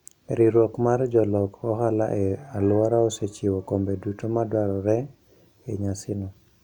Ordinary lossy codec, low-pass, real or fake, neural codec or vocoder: none; 19.8 kHz; real; none